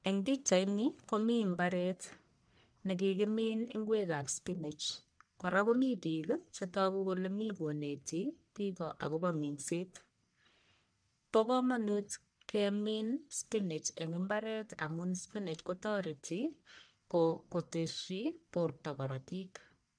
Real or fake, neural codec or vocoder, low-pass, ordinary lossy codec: fake; codec, 44.1 kHz, 1.7 kbps, Pupu-Codec; 9.9 kHz; none